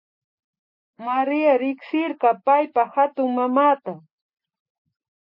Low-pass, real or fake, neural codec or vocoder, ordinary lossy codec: 5.4 kHz; real; none; MP3, 32 kbps